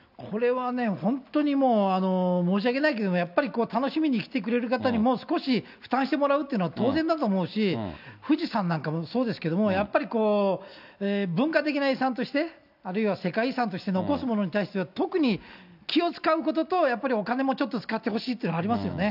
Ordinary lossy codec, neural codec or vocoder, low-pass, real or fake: none; none; 5.4 kHz; real